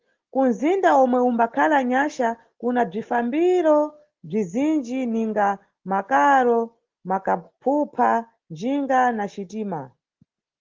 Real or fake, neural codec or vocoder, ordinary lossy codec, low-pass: real; none; Opus, 16 kbps; 7.2 kHz